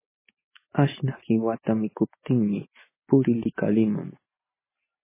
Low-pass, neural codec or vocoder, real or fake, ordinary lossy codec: 3.6 kHz; none; real; MP3, 16 kbps